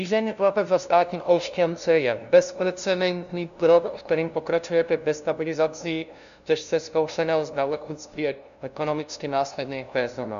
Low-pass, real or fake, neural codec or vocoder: 7.2 kHz; fake; codec, 16 kHz, 0.5 kbps, FunCodec, trained on LibriTTS, 25 frames a second